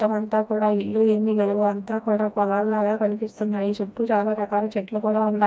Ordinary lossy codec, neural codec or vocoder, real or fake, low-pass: none; codec, 16 kHz, 1 kbps, FreqCodec, smaller model; fake; none